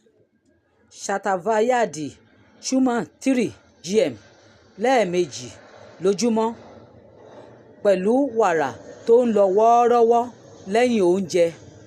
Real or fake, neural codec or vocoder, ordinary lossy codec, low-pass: real; none; none; 14.4 kHz